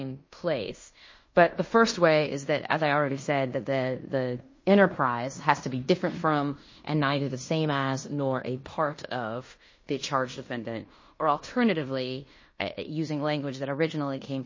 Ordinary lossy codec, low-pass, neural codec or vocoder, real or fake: MP3, 32 kbps; 7.2 kHz; codec, 16 kHz in and 24 kHz out, 0.9 kbps, LongCat-Audio-Codec, fine tuned four codebook decoder; fake